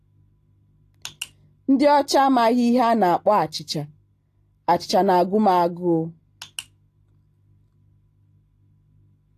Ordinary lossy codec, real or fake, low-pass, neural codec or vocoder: AAC, 48 kbps; real; 14.4 kHz; none